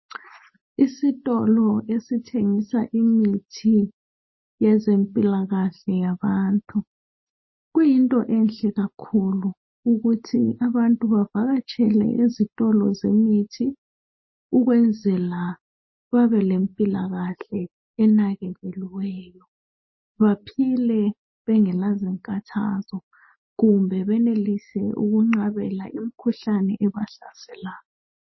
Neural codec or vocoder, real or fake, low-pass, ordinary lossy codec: none; real; 7.2 kHz; MP3, 24 kbps